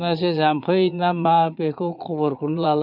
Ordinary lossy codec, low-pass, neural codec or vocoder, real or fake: none; 5.4 kHz; vocoder, 22.05 kHz, 80 mel bands, Vocos; fake